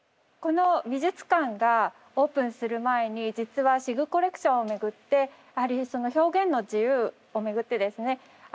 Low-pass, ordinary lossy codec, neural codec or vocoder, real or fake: none; none; none; real